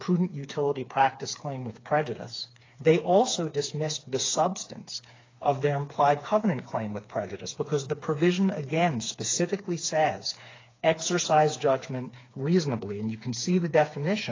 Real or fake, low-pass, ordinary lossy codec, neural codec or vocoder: fake; 7.2 kHz; AAC, 32 kbps; codec, 16 kHz, 4 kbps, FreqCodec, smaller model